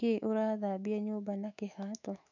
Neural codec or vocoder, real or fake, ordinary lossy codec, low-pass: autoencoder, 48 kHz, 128 numbers a frame, DAC-VAE, trained on Japanese speech; fake; none; 7.2 kHz